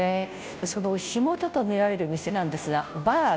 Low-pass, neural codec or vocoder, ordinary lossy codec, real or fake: none; codec, 16 kHz, 0.5 kbps, FunCodec, trained on Chinese and English, 25 frames a second; none; fake